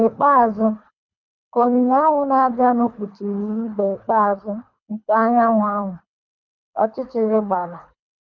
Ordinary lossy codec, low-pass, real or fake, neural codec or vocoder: none; 7.2 kHz; fake; codec, 24 kHz, 3 kbps, HILCodec